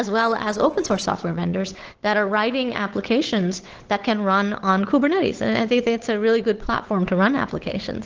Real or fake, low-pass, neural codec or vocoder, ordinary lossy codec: fake; 7.2 kHz; codec, 16 kHz, 8 kbps, FunCodec, trained on Chinese and English, 25 frames a second; Opus, 16 kbps